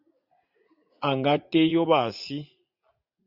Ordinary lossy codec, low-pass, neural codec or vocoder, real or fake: Opus, 64 kbps; 5.4 kHz; vocoder, 44.1 kHz, 80 mel bands, Vocos; fake